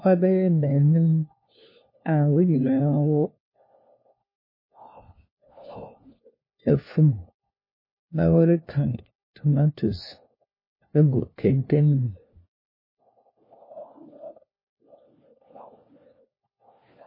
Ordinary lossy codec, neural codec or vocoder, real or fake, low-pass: MP3, 24 kbps; codec, 16 kHz, 1 kbps, FunCodec, trained on LibriTTS, 50 frames a second; fake; 5.4 kHz